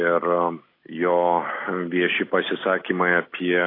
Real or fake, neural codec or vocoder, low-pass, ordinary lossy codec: real; none; 5.4 kHz; AAC, 24 kbps